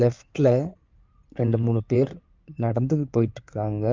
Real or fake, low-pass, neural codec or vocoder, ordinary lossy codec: fake; 7.2 kHz; codec, 16 kHz in and 24 kHz out, 2.2 kbps, FireRedTTS-2 codec; Opus, 24 kbps